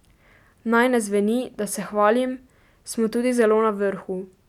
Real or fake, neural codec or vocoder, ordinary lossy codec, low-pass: real; none; none; 19.8 kHz